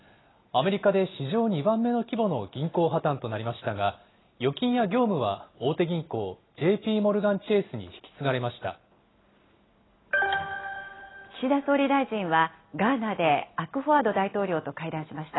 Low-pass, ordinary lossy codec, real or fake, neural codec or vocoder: 7.2 kHz; AAC, 16 kbps; real; none